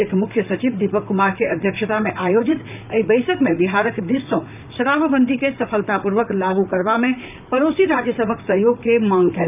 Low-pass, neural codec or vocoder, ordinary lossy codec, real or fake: 3.6 kHz; vocoder, 44.1 kHz, 128 mel bands, Pupu-Vocoder; none; fake